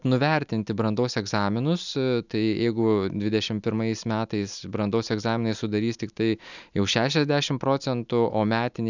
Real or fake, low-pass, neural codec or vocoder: real; 7.2 kHz; none